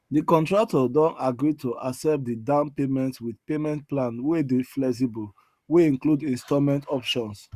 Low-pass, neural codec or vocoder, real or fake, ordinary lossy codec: 14.4 kHz; codec, 44.1 kHz, 7.8 kbps, Pupu-Codec; fake; Opus, 64 kbps